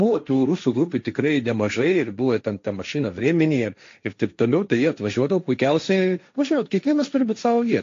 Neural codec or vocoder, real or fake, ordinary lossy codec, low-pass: codec, 16 kHz, 1.1 kbps, Voila-Tokenizer; fake; AAC, 48 kbps; 7.2 kHz